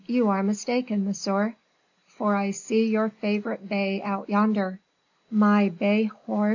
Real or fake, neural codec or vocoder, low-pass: real; none; 7.2 kHz